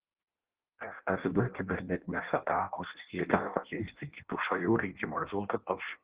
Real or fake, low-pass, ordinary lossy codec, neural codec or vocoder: fake; 3.6 kHz; Opus, 16 kbps; codec, 16 kHz in and 24 kHz out, 0.6 kbps, FireRedTTS-2 codec